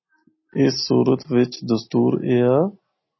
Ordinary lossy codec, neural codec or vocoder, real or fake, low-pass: MP3, 24 kbps; none; real; 7.2 kHz